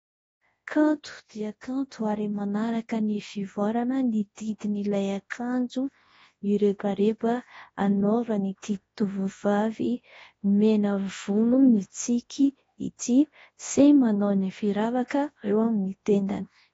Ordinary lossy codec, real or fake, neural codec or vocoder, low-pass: AAC, 24 kbps; fake; codec, 24 kHz, 0.9 kbps, WavTokenizer, large speech release; 10.8 kHz